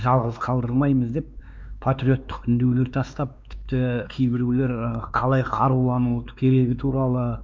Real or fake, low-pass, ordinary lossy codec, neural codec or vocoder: fake; none; none; codec, 16 kHz, 2 kbps, X-Codec, WavLM features, trained on Multilingual LibriSpeech